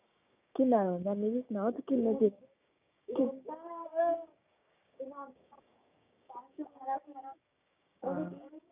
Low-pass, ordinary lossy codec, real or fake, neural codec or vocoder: 3.6 kHz; none; fake; codec, 16 kHz, 6 kbps, DAC